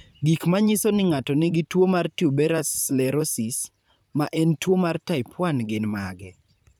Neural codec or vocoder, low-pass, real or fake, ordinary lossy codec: vocoder, 44.1 kHz, 128 mel bands, Pupu-Vocoder; none; fake; none